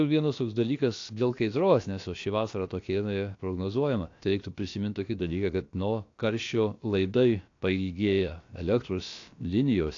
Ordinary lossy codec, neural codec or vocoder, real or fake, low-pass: AAC, 48 kbps; codec, 16 kHz, about 1 kbps, DyCAST, with the encoder's durations; fake; 7.2 kHz